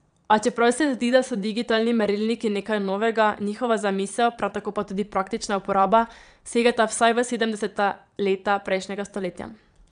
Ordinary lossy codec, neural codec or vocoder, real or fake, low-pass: none; vocoder, 22.05 kHz, 80 mel bands, Vocos; fake; 9.9 kHz